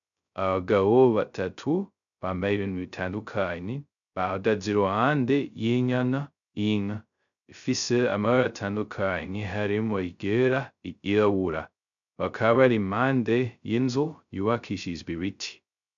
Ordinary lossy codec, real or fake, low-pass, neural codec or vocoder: MP3, 96 kbps; fake; 7.2 kHz; codec, 16 kHz, 0.2 kbps, FocalCodec